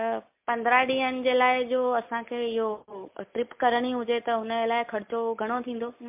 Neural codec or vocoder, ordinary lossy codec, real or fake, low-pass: none; MP3, 32 kbps; real; 3.6 kHz